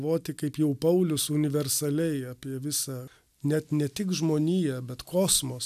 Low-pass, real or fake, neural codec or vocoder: 14.4 kHz; real; none